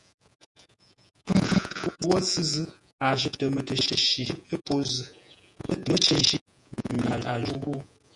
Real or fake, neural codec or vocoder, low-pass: fake; vocoder, 48 kHz, 128 mel bands, Vocos; 10.8 kHz